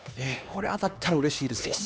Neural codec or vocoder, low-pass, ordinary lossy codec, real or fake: codec, 16 kHz, 2 kbps, X-Codec, HuBERT features, trained on LibriSpeech; none; none; fake